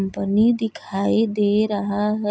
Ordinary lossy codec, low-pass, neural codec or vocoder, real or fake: none; none; none; real